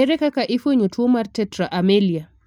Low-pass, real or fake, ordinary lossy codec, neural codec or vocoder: 19.8 kHz; real; MP3, 96 kbps; none